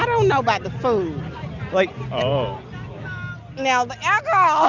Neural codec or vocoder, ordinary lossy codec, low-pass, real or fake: none; Opus, 64 kbps; 7.2 kHz; real